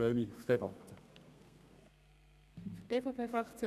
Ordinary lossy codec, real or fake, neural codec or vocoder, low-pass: none; fake; codec, 32 kHz, 1.9 kbps, SNAC; 14.4 kHz